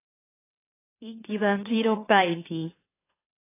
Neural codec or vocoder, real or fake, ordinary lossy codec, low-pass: autoencoder, 44.1 kHz, a latent of 192 numbers a frame, MeloTTS; fake; AAC, 16 kbps; 3.6 kHz